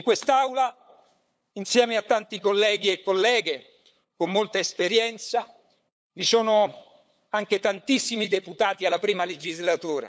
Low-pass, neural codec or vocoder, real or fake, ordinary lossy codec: none; codec, 16 kHz, 8 kbps, FunCodec, trained on LibriTTS, 25 frames a second; fake; none